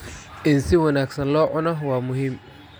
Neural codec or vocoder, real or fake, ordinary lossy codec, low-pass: none; real; none; none